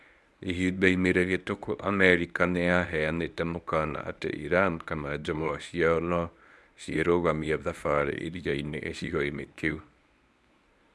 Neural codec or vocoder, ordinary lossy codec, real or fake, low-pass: codec, 24 kHz, 0.9 kbps, WavTokenizer, medium speech release version 1; none; fake; none